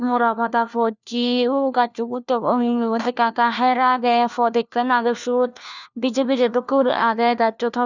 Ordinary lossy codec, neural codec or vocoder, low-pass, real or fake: none; codec, 16 kHz, 1 kbps, FunCodec, trained on LibriTTS, 50 frames a second; 7.2 kHz; fake